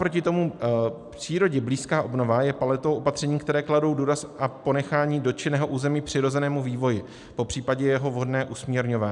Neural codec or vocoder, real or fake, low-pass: none; real; 10.8 kHz